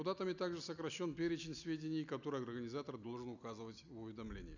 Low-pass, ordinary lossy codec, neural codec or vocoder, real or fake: 7.2 kHz; none; none; real